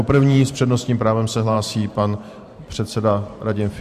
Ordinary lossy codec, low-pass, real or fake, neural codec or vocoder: MP3, 64 kbps; 14.4 kHz; fake; vocoder, 44.1 kHz, 128 mel bands every 512 samples, BigVGAN v2